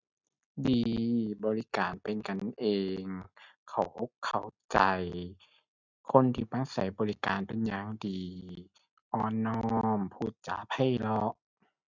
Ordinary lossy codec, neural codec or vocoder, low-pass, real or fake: none; none; 7.2 kHz; real